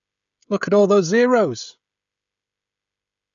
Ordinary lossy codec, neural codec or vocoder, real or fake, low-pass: none; codec, 16 kHz, 16 kbps, FreqCodec, smaller model; fake; 7.2 kHz